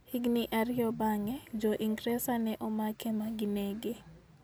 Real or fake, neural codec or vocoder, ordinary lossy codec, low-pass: real; none; none; none